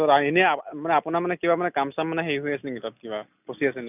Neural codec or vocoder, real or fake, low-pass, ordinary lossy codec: none; real; 3.6 kHz; none